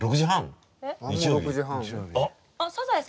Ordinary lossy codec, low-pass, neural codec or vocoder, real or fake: none; none; none; real